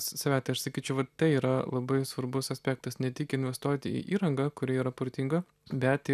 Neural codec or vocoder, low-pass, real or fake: none; 14.4 kHz; real